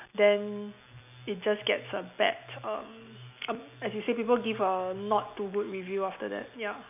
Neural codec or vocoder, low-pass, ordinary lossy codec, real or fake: none; 3.6 kHz; none; real